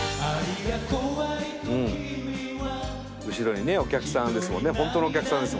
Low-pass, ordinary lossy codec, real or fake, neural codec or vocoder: none; none; real; none